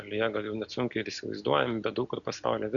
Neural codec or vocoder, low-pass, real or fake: none; 7.2 kHz; real